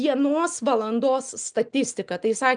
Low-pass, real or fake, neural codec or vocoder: 9.9 kHz; real; none